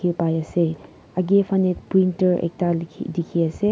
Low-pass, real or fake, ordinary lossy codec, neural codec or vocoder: none; real; none; none